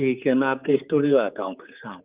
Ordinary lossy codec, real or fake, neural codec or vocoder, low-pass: Opus, 24 kbps; fake; codec, 16 kHz, 4 kbps, X-Codec, HuBERT features, trained on general audio; 3.6 kHz